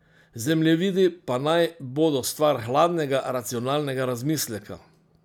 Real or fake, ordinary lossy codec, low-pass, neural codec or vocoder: real; none; 19.8 kHz; none